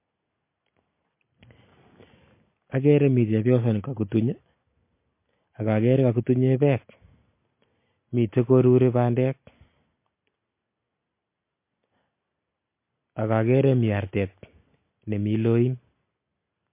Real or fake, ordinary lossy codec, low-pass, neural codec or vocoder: real; MP3, 24 kbps; 3.6 kHz; none